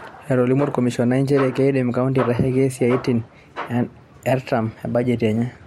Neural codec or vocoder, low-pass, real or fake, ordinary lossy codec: vocoder, 44.1 kHz, 128 mel bands every 512 samples, BigVGAN v2; 19.8 kHz; fake; MP3, 64 kbps